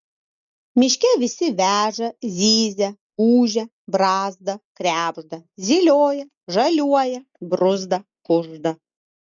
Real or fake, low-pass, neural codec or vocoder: real; 7.2 kHz; none